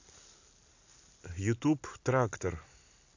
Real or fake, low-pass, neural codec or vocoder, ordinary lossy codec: real; 7.2 kHz; none; AAC, 48 kbps